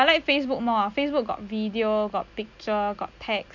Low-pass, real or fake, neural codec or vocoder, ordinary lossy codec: 7.2 kHz; real; none; none